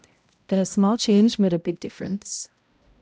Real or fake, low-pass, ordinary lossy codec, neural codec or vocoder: fake; none; none; codec, 16 kHz, 0.5 kbps, X-Codec, HuBERT features, trained on balanced general audio